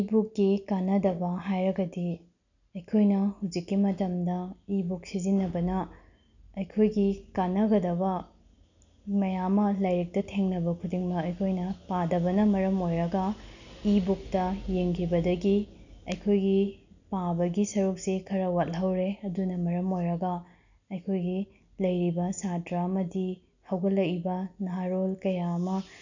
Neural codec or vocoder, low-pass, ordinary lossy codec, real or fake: none; 7.2 kHz; none; real